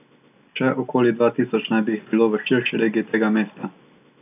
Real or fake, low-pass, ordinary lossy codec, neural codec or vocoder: real; 3.6 kHz; none; none